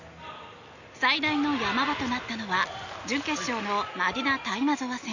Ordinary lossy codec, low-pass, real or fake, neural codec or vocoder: none; 7.2 kHz; real; none